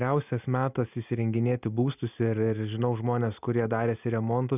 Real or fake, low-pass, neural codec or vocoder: real; 3.6 kHz; none